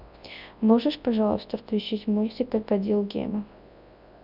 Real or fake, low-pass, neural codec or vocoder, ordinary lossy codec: fake; 5.4 kHz; codec, 24 kHz, 0.9 kbps, WavTokenizer, large speech release; none